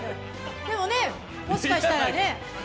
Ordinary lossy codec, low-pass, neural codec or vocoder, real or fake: none; none; none; real